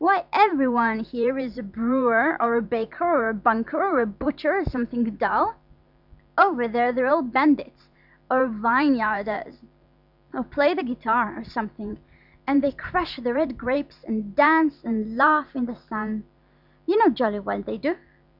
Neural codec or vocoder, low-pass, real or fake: vocoder, 44.1 kHz, 128 mel bands every 512 samples, BigVGAN v2; 5.4 kHz; fake